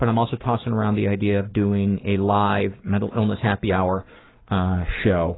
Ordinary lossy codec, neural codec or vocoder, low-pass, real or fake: AAC, 16 kbps; codec, 44.1 kHz, 7.8 kbps, Pupu-Codec; 7.2 kHz; fake